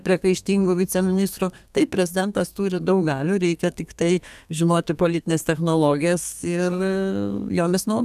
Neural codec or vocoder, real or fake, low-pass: codec, 32 kHz, 1.9 kbps, SNAC; fake; 14.4 kHz